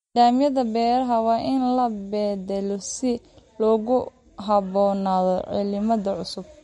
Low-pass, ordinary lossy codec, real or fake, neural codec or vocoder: 14.4 kHz; MP3, 48 kbps; real; none